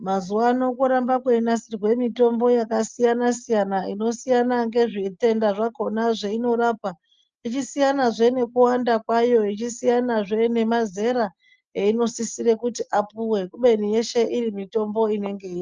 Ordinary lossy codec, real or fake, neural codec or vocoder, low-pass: Opus, 24 kbps; real; none; 7.2 kHz